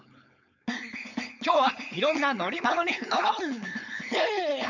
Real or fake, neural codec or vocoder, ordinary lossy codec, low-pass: fake; codec, 16 kHz, 4.8 kbps, FACodec; none; 7.2 kHz